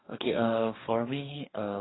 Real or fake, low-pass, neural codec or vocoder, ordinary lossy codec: fake; 7.2 kHz; codec, 44.1 kHz, 2.6 kbps, DAC; AAC, 16 kbps